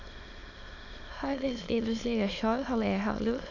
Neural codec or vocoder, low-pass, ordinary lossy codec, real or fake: autoencoder, 22.05 kHz, a latent of 192 numbers a frame, VITS, trained on many speakers; 7.2 kHz; none; fake